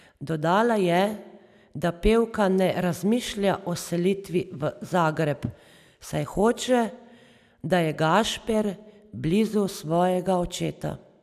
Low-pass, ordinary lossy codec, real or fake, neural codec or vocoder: 14.4 kHz; none; real; none